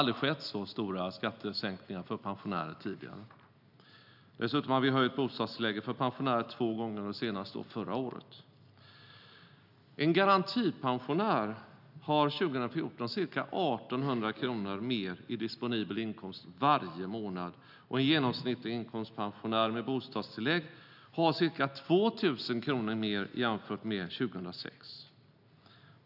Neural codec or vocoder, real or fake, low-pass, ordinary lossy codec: none; real; 5.4 kHz; none